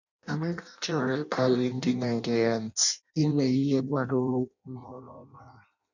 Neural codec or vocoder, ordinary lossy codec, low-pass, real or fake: codec, 16 kHz in and 24 kHz out, 0.6 kbps, FireRedTTS-2 codec; none; 7.2 kHz; fake